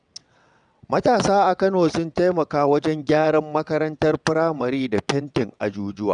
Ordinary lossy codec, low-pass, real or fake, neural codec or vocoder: none; 9.9 kHz; fake; vocoder, 22.05 kHz, 80 mel bands, WaveNeXt